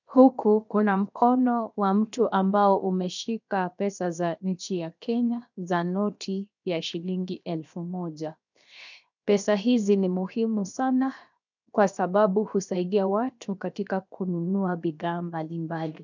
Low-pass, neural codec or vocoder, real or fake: 7.2 kHz; codec, 16 kHz, 0.7 kbps, FocalCodec; fake